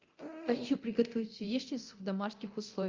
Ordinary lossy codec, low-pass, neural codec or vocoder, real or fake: Opus, 32 kbps; 7.2 kHz; codec, 24 kHz, 0.9 kbps, DualCodec; fake